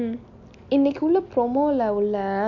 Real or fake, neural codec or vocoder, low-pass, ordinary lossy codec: real; none; 7.2 kHz; AAC, 48 kbps